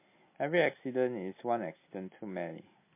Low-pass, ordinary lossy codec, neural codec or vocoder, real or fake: 3.6 kHz; MP3, 24 kbps; none; real